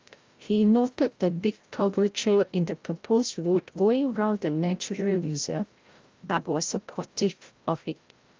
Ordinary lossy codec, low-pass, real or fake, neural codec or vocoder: Opus, 32 kbps; 7.2 kHz; fake; codec, 16 kHz, 0.5 kbps, FreqCodec, larger model